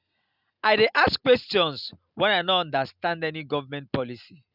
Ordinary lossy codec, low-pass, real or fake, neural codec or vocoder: none; 5.4 kHz; real; none